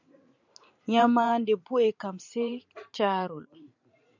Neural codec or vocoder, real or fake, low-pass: vocoder, 44.1 kHz, 128 mel bands every 512 samples, BigVGAN v2; fake; 7.2 kHz